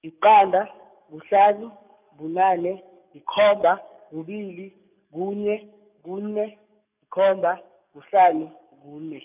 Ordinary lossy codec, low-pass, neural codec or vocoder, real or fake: none; 3.6 kHz; codec, 44.1 kHz, 7.8 kbps, DAC; fake